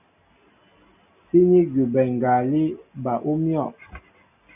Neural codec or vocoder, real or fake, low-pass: none; real; 3.6 kHz